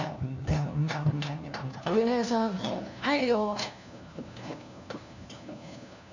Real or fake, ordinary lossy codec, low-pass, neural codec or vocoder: fake; none; 7.2 kHz; codec, 16 kHz, 1 kbps, FunCodec, trained on LibriTTS, 50 frames a second